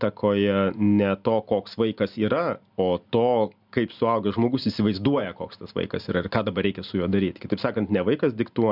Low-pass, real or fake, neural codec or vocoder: 5.4 kHz; real; none